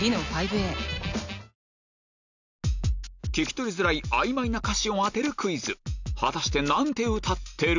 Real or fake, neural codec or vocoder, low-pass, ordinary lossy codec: real; none; 7.2 kHz; none